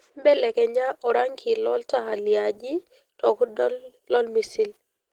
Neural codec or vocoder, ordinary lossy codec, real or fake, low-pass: none; Opus, 16 kbps; real; 19.8 kHz